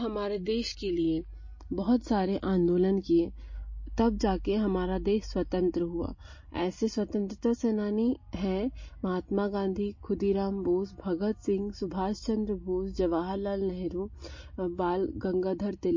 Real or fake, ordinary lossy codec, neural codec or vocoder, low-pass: real; MP3, 32 kbps; none; 7.2 kHz